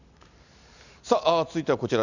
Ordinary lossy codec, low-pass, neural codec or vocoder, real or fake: none; 7.2 kHz; none; real